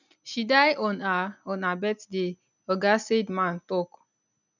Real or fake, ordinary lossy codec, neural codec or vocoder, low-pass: real; none; none; 7.2 kHz